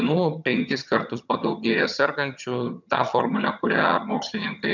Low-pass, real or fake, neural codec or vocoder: 7.2 kHz; fake; vocoder, 22.05 kHz, 80 mel bands, HiFi-GAN